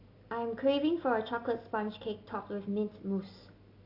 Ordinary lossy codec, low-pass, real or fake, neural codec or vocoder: AAC, 32 kbps; 5.4 kHz; real; none